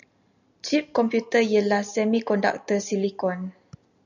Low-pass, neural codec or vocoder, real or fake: 7.2 kHz; none; real